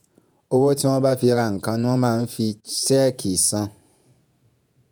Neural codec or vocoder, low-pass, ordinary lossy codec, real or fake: vocoder, 48 kHz, 128 mel bands, Vocos; none; none; fake